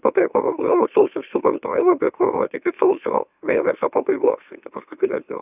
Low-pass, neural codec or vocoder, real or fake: 3.6 kHz; autoencoder, 44.1 kHz, a latent of 192 numbers a frame, MeloTTS; fake